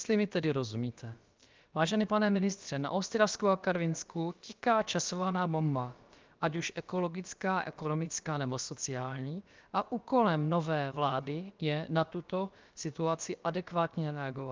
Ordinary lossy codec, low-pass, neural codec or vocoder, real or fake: Opus, 24 kbps; 7.2 kHz; codec, 16 kHz, about 1 kbps, DyCAST, with the encoder's durations; fake